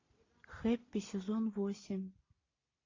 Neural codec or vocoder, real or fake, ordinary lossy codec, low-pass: none; real; AAC, 32 kbps; 7.2 kHz